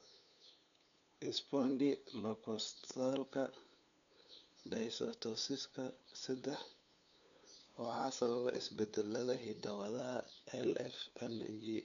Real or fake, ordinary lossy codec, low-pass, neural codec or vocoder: fake; none; 7.2 kHz; codec, 16 kHz, 2 kbps, FunCodec, trained on LibriTTS, 25 frames a second